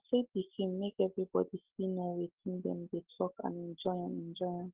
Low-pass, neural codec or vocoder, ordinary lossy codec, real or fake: 3.6 kHz; none; Opus, 16 kbps; real